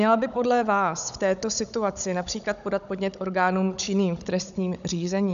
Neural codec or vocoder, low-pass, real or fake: codec, 16 kHz, 16 kbps, FunCodec, trained on Chinese and English, 50 frames a second; 7.2 kHz; fake